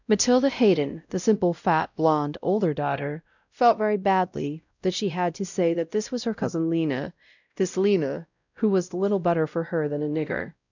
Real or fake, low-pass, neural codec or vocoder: fake; 7.2 kHz; codec, 16 kHz, 0.5 kbps, X-Codec, WavLM features, trained on Multilingual LibriSpeech